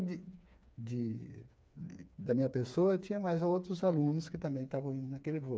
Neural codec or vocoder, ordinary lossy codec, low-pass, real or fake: codec, 16 kHz, 4 kbps, FreqCodec, smaller model; none; none; fake